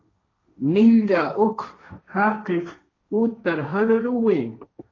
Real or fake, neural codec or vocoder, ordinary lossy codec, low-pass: fake; codec, 16 kHz, 1.1 kbps, Voila-Tokenizer; AAC, 32 kbps; 7.2 kHz